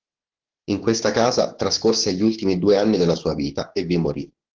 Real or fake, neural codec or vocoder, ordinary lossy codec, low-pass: fake; codec, 16 kHz, 6 kbps, DAC; Opus, 16 kbps; 7.2 kHz